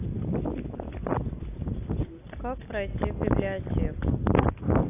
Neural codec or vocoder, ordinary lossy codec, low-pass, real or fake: none; AAC, 24 kbps; 3.6 kHz; real